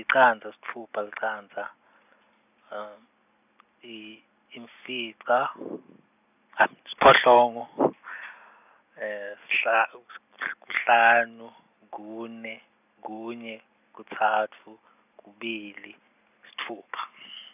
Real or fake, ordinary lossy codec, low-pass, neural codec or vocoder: real; none; 3.6 kHz; none